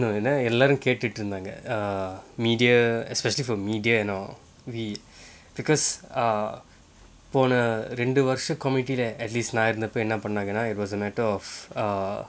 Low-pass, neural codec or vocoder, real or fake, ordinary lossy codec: none; none; real; none